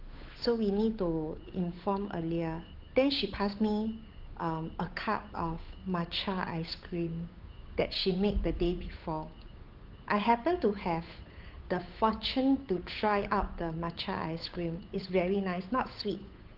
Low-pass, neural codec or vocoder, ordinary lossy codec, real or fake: 5.4 kHz; codec, 16 kHz, 8 kbps, FunCodec, trained on Chinese and English, 25 frames a second; Opus, 32 kbps; fake